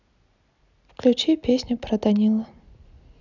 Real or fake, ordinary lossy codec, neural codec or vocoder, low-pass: real; none; none; 7.2 kHz